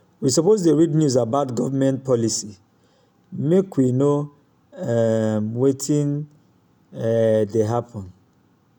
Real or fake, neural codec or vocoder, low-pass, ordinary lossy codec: real; none; 19.8 kHz; none